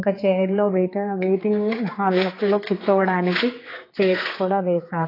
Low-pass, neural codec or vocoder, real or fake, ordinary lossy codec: 5.4 kHz; codec, 16 kHz, 4 kbps, X-Codec, HuBERT features, trained on general audio; fake; AAC, 24 kbps